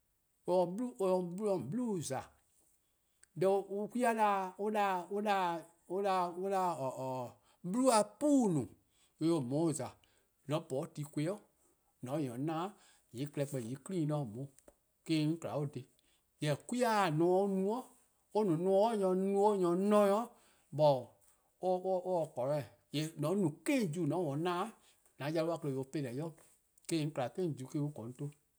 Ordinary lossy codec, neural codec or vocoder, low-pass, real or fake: none; none; none; real